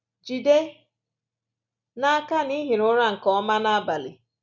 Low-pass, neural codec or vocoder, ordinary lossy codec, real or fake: 7.2 kHz; none; none; real